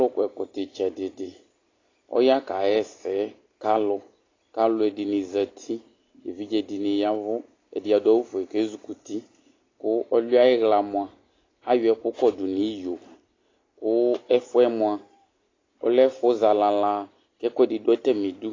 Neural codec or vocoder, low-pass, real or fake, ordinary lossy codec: none; 7.2 kHz; real; AAC, 32 kbps